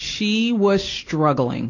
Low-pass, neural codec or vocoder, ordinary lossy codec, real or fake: 7.2 kHz; none; AAC, 32 kbps; real